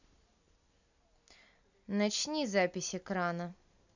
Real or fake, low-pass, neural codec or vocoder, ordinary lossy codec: real; 7.2 kHz; none; none